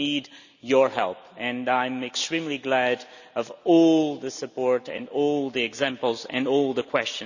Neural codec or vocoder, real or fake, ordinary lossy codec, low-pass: none; real; none; 7.2 kHz